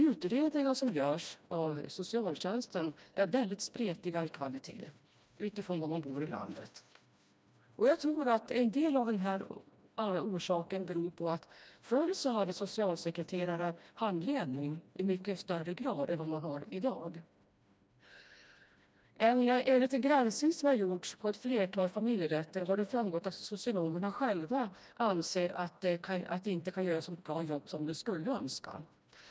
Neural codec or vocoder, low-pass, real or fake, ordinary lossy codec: codec, 16 kHz, 1 kbps, FreqCodec, smaller model; none; fake; none